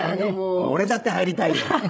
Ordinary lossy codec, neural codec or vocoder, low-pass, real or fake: none; codec, 16 kHz, 16 kbps, FreqCodec, larger model; none; fake